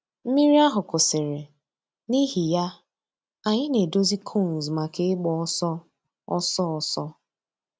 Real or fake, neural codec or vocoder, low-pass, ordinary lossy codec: real; none; none; none